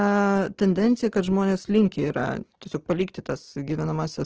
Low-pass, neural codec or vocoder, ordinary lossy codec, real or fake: 7.2 kHz; none; Opus, 16 kbps; real